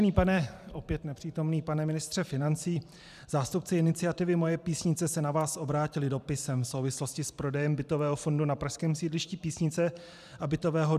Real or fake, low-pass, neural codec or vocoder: fake; 14.4 kHz; vocoder, 44.1 kHz, 128 mel bands every 512 samples, BigVGAN v2